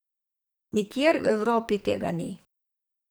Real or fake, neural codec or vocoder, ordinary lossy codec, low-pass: fake; codec, 44.1 kHz, 2.6 kbps, SNAC; none; none